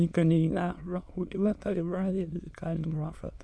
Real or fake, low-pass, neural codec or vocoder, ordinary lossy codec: fake; none; autoencoder, 22.05 kHz, a latent of 192 numbers a frame, VITS, trained on many speakers; none